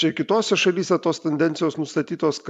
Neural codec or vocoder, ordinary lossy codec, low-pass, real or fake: none; AAC, 96 kbps; 14.4 kHz; real